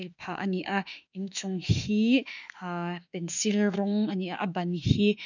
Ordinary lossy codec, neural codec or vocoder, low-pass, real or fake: none; autoencoder, 48 kHz, 32 numbers a frame, DAC-VAE, trained on Japanese speech; 7.2 kHz; fake